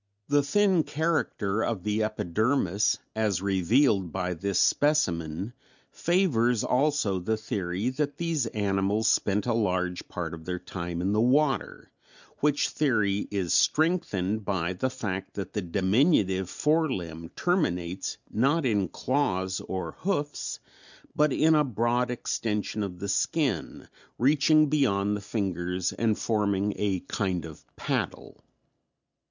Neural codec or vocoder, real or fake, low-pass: none; real; 7.2 kHz